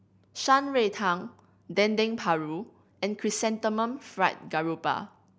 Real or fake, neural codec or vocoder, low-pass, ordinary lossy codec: real; none; none; none